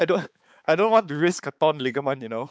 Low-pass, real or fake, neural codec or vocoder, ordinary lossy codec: none; fake; codec, 16 kHz, 4 kbps, X-Codec, HuBERT features, trained on balanced general audio; none